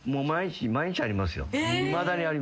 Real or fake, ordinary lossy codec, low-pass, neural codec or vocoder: real; none; none; none